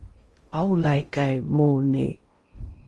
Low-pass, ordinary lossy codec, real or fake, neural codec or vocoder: 10.8 kHz; Opus, 24 kbps; fake; codec, 16 kHz in and 24 kHz out, 0.6 kbps, FocalCodec, streaming, 2048 codes